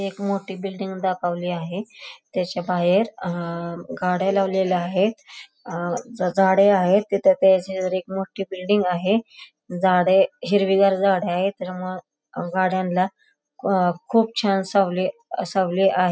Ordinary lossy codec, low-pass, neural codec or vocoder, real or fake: none; none; none; real